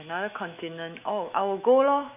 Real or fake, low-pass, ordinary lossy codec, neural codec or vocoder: fake; 3.6 kHz; none; autoencoder, 48 kHz, 128 numbers a frame, DAC-VAE, trained on Japanese speech